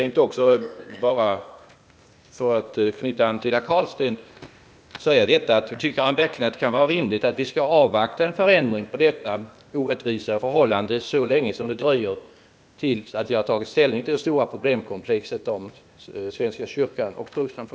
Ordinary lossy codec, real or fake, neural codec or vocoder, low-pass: none; fake; codec, 16 kHz, 0.8 kbps, ZipCodec; none